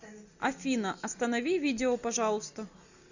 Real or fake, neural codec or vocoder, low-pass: real; none; 7.2 kHz